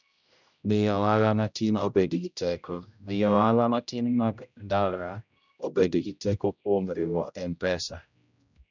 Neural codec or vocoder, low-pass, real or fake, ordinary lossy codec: codec, 16 kHz, 0.5 kbps, X-Codec, HuBERT features, trained on general audio; 7.2 kHz; fake; none